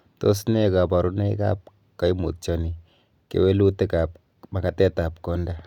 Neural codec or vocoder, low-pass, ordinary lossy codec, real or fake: none; 19.8 kHz; none; real